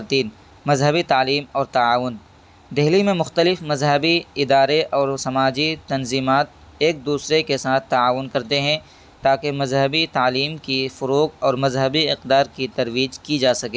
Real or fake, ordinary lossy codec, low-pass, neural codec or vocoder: real; none; none; none